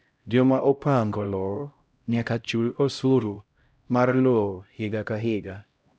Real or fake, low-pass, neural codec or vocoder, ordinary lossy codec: fake; none; codec, 16 kHz, 0.5 kbps, X-Codec, HuBERT features, trained on LibriSpeech; none